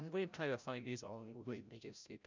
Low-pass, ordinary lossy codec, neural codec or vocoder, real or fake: 7.2 kHz; MP3, 64 kbps; codec, 16 kHz, 0.5 kbps, FreqCodec, larger model; fake